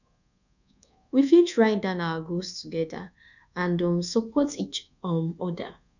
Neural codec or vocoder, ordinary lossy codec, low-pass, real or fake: codec, 24 kHz, 1.2 kbps, DualCodec; none; 7.2 kHz; fake